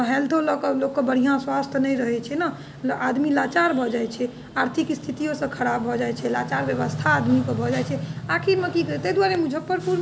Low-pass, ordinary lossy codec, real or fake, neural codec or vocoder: none; none; real; none